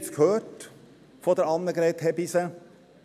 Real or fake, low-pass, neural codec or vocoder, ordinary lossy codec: real; 14.4 kHz; none; none